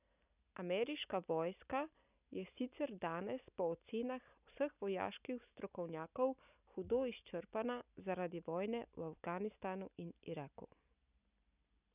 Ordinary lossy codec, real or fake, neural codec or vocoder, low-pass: none; real; none; 3.6 kHz